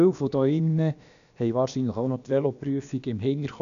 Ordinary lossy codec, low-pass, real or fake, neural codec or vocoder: none; 7.2 kHz; fake; codec, 16 kHz, about 1 kbps, DyCAST, with the encoder's durations